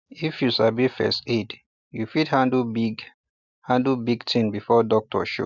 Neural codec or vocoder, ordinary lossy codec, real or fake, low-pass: none; none; real; 7.2 kHz